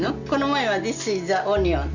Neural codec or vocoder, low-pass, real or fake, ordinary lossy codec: none; 7.2 kHz; real; none